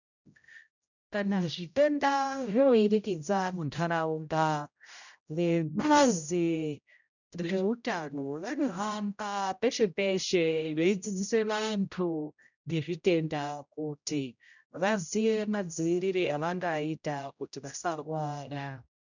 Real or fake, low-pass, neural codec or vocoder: fake; 7.2 kHz; codec, 16 kHz, 0.5 kbps, X-Codec, HuBERT features, trained on general audio